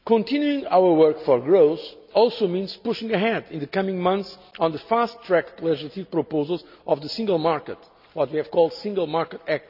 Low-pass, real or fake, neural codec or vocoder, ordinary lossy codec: 5.4 kHz; real; none; none